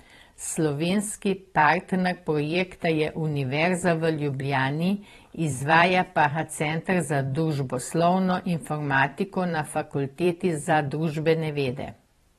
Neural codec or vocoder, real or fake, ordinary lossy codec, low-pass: vocoder, 44.1 kHz, 128 mel bands every 512 samples, BigVGAN v2; fake; AAC, 32 kbps; 19.8 kHz